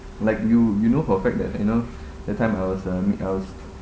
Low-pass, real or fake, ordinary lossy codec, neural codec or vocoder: none; real; none; none